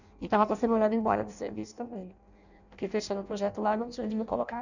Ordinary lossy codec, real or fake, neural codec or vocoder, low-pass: none; fake; codec, 16 kHz in and 24 kHz out, 0.6 kbps, FireRedTTS-2 codec; 7.2 kHz